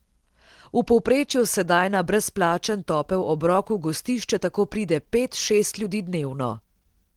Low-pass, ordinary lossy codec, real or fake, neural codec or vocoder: 19.8 kHz; Opus, 16 kbps; real; none